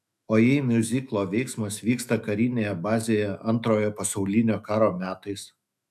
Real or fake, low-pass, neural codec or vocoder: fake; 14.4 kHz; autoencoder, 48 kHz, 128 numbers a frame, DAC-VAE, trained on Japanese speech